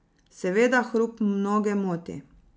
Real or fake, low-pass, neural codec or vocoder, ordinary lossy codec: real; none; none; none